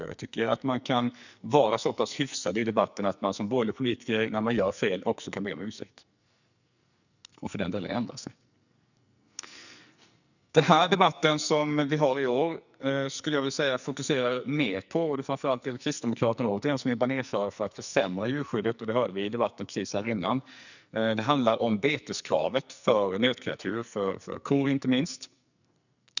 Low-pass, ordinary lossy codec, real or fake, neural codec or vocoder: 7.2 kHz; none; fake; codec, 44.1 kHz, 2.6 kbps, SNAC